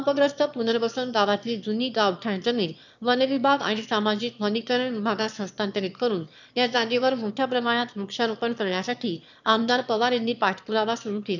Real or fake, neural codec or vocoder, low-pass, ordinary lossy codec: fake; autoencoder, 22.05 kHz, a latent of 192 numbers a frame, VITS, trained on one speaker; 7.2 kHz; none